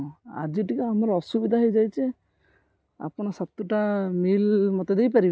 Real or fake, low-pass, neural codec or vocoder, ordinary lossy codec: real; none; none; none